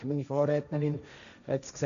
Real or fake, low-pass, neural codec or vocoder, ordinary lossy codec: fake; 7.2 kHz; codec, 16 kHz, 1.1 kbps, Voila-Tokenizer; none